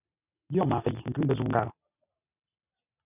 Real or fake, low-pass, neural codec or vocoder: real; 3.6 kHz; none